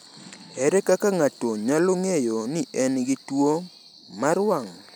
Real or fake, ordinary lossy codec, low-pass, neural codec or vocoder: real; none; none; none